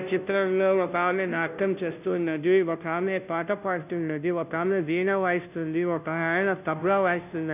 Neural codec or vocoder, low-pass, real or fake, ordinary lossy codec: codec, 16 kHz, 0.5 kbps, FunCodec, trained on Chinese and English, 25 frames a second; 3.6 kHz; fake; none